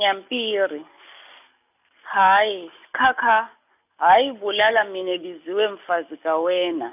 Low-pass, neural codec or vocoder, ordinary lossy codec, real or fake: 3.6 kHz; none; none; real